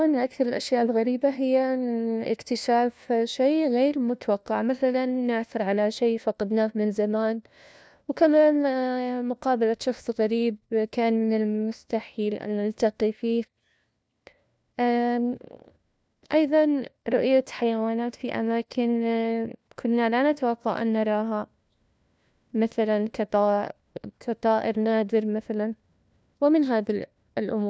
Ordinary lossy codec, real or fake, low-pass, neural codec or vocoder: none; fake; none; codec, 16 kHz, 1 kbps, FunCodec, trained on LibriTTS, 50 frames a second